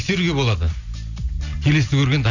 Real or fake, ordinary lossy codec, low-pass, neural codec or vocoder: real; none; 7.2 kHz; none